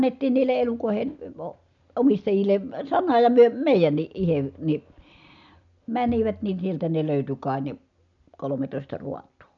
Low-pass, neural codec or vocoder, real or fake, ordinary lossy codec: 7.2 kHz; none; real; none